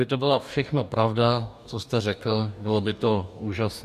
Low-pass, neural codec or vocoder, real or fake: 14.4 kHz; codec, 44.1 kHz, 2.6 kbps, DAC; fake